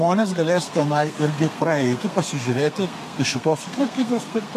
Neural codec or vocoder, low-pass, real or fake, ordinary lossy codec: codec, 32 kHz, 1.9 kbps, SNAC; 14.4 kHz; fake; MP3, 64 kbps